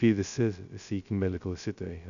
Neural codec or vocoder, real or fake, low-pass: codec, 16 kHz, 0.2 kbps, FocalCodec; fake; 7.2 kHz